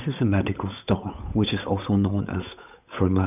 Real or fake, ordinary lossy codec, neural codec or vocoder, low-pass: fake; none; codec, 16 kHz, 8 kbps, FunCodec, trained on Chinese and English, 25 frames a second; 3.6 kHz